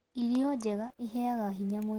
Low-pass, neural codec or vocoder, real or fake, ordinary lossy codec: 19.8 kHz; none; real; Opus, 16 kbps